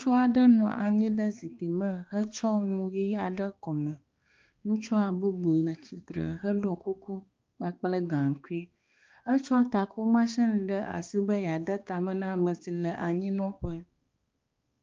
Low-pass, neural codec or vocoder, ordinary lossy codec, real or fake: 7.2 kHz; codec, 16 kHz, 2 kbps, X-Codec, HuBERT features, trained on balanced general audio; Opus, 24 kbps; fake